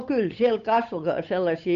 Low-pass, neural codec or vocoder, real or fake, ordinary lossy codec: 7.2 kHz; codec, 16 kHz, 8 kbps, FunCodec, trained on Chinese and English, 25 frames a second; fake; MP3, 64 kbps